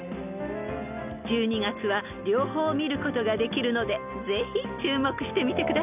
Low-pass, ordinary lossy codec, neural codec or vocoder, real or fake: 3.6 kHz; none; none; real